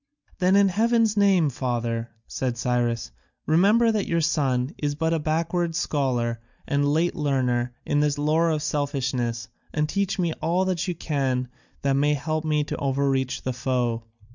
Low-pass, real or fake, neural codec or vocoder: 7.2 kHz; real; none